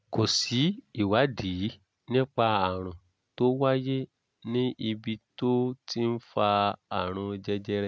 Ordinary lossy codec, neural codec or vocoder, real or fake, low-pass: none; none; real; none